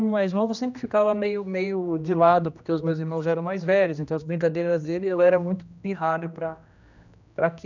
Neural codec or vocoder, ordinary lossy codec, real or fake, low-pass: codec, 16 kHz, 1 kbps, X-Codec, HuBERT features, trained on general audio; none; fake; 7.2 kHz